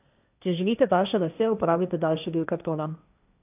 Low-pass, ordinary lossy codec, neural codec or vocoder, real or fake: 3.6 kHz; none; codec, 16 kHz, 1.1 kbps, Voila-Tokenizer; fake